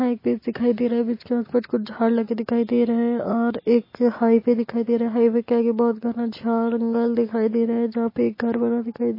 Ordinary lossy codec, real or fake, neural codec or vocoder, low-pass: MP3, 24 kbps; real; none; 5.4 kHz